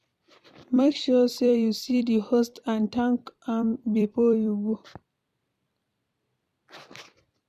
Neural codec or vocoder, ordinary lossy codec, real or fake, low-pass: vocoder, 44.1 kHz, 128 mel bands every 256 samples, BigVGAN v2; none; fake; 14.4 kHz